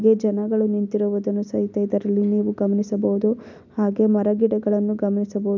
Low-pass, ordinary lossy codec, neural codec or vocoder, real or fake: 7.2 kHz; none; none; real